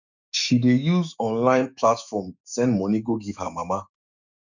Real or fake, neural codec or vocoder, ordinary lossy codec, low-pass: fake; autoencoder, 48 kHz, 128 numbers a frame, DAC-VAE, trained on Japanese speech; none; 7.2 kHz